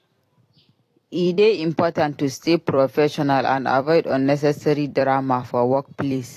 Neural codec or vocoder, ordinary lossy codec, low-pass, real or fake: vocoder, 44.1 kHz, 128 mel bands every 256 samples, BigVGAN v2; AAC, 48 kbps; 14.4 kHz; fake